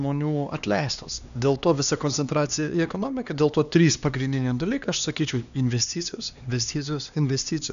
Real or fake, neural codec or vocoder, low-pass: fake; codec, 16 kHz, 2 kbps, X-Codec, HuBERT features, trained on LibriSpeech; 7.2 kHz